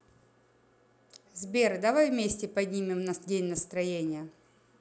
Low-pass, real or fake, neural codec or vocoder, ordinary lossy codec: none; real; none; none